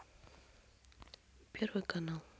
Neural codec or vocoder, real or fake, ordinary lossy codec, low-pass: none; real; none; none